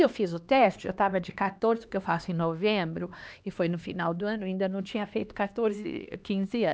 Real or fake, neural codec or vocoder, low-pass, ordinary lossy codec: fake; codec, 16 kHz, 2 kbps, X-Codec, HuBERT features, trained on LibriSpeech; none; none